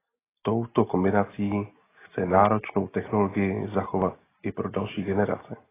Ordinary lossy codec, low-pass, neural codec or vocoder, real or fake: AAC, 16 kbps; 3.6 kHz; none; real